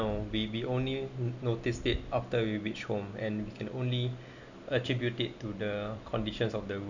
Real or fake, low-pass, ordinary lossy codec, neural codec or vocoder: real; 7.2 kHz; none; none